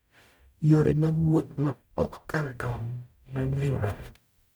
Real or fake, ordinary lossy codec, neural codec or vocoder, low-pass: fake; none; codec, 44.1 kHz, 0.9 kbps, DAC; none